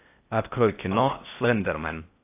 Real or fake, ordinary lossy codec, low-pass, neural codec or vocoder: fake; AAC, 24 kbps; 3.6 kHz; codec, 16 kHz in and 24 kHz out, 0.6 kbps, FocalCodec, streaming, 4096 codes